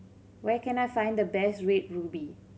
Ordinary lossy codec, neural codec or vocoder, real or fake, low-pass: none; none; real; none